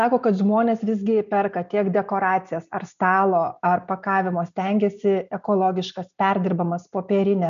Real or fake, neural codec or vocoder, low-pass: real; none; 7.2 kHz